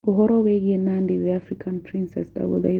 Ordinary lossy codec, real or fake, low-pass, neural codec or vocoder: Opus, 16 kbps; real; 19.8 kHz; none